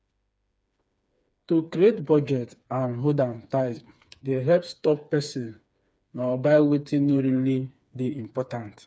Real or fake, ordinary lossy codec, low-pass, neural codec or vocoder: fake; none; none; codec, 16 kHz, 4 kbps, FreqCodec, smaller model